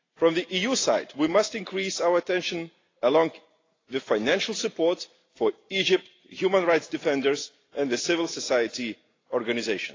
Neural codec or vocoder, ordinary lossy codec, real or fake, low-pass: none; AAC, 32 kbps; real; 7.2 kHz